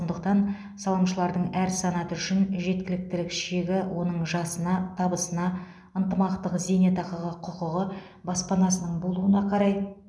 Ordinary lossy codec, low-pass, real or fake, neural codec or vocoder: none; none; real; none